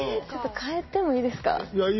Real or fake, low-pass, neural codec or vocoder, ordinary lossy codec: real; 7.2 kHz; none; MP3, 24 kbps